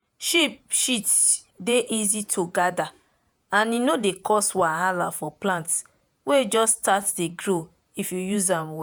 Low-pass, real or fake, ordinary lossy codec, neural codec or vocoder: none; fake; none; vocoder, 48 kHz, 128 mel bands, Vocos